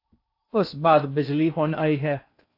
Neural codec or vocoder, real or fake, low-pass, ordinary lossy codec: codec, 16 kHz in and 24 kHz out, 0.6 kbps, FocalCodec, streaming, 4096 codes; fake; 5.4 kHz; AAC, 32 kbps